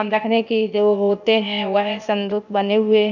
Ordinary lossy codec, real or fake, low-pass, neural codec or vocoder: none; fake; 7.2 kHz; codec, 16 kHz, 0.8 kbps, ZipCodec